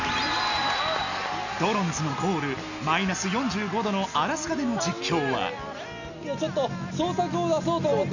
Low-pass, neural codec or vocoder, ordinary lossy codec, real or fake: 7.2 kHz; none; none; real